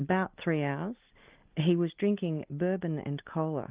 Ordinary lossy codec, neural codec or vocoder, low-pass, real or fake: Opus, 24 kbps; none; 3.6 kHz; real